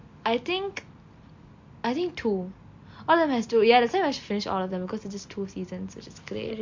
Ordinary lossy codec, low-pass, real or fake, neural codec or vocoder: MP3, 48 kbps; 7.2 kHz; real; none